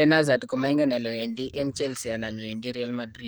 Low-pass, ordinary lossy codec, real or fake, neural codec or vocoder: none; none; fake; codec, 44.1 kHz, 2.6 kbps, SNAC